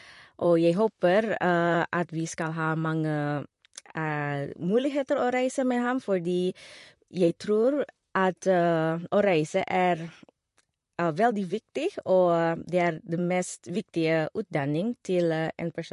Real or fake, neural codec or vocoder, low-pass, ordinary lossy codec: fake; vocoder, 44.1 kHz, 128 mel bands, Pupu-Vocoder; 14.4 kHz; MP3, 48 kbps